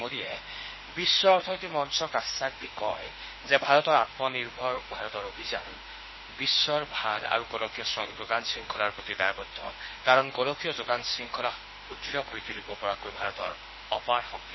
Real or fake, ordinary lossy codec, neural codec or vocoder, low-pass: fake; MP3, 24 kbps; autoencoder, 48 kHz, 32 numbers a frame, DAC-VAE, trained on Japanese speech; 7.2 kHz